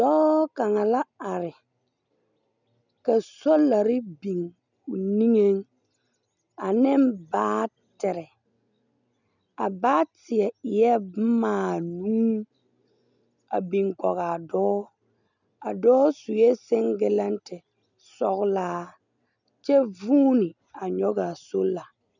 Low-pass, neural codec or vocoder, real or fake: 7.2 kHz; none; real